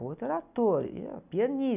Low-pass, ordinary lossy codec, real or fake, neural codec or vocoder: 3.6 kHz; none; real; none